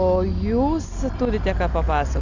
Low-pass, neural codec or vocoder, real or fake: 7.2 kHz; none; real